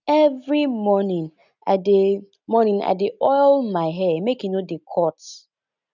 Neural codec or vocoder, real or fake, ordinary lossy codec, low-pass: none; real; none; 7.2 kHz